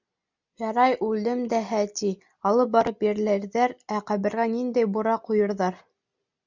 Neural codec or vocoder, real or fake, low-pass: none; real; 7.2 kHz